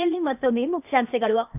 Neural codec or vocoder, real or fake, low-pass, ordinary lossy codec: codec, 16 kHz, 0.8 kbps, ZipCodec; fake; 3.6 kHz; none